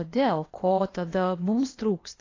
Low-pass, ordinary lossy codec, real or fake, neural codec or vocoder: 7.2 kHz; AAC, 32 kbps; fake; codec, 16 kHz, 0.8 kbps, ZipCodec